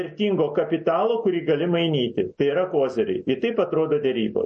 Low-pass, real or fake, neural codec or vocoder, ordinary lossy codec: 10.8 kHz; real; none; MP3, 32 kbps